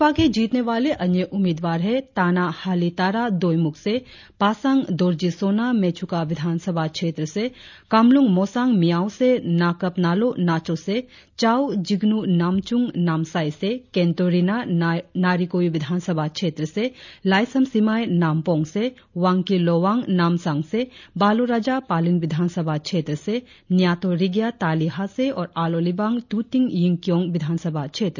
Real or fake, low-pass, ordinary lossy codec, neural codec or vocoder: real; 7.2 kHz; none; none